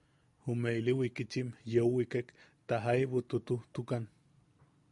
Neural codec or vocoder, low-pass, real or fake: none; 10.8 kHz; real